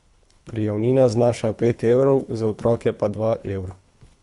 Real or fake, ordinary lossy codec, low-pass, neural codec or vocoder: fake; Opus, 64 kbps; 10.8 kHz; codec, 24 kHz, 3 kbps, HILCodec